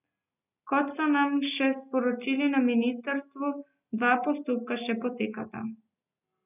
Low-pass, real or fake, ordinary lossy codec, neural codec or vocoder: 3.6 kHz; real; none; none